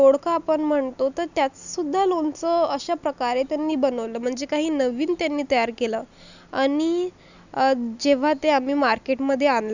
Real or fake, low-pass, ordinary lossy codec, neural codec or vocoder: real; 7.2 kHz; none; none